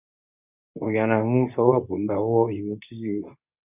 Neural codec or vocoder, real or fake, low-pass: codec, 24 kHz, 0.9 kbps, WavTokenizer, medium speech release version 2; fake; 3.6 kHz